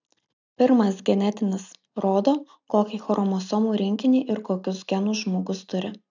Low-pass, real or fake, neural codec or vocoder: 7.2 kHz; real; none